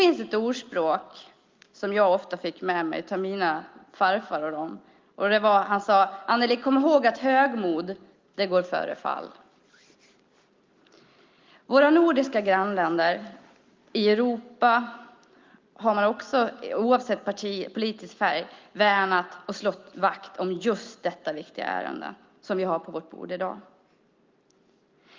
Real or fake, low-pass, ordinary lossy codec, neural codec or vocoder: real; 7.2 kHz; Opus, 32 kbps; none